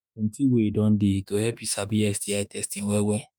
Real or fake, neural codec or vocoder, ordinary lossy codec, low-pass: fake; autoencoder, 48 kHz, 32 numbers a frame, DAC-VAE, trained on Japanese speech; none; none